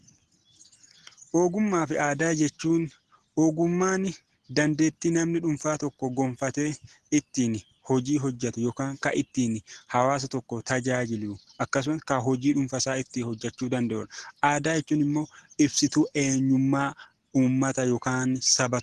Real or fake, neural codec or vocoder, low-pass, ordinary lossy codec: real; none; 10.8 kHz; Opus, 16 kbps